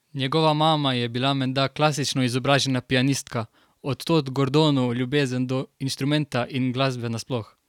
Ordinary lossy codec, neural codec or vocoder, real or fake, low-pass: none; none; real; 19.8 kHz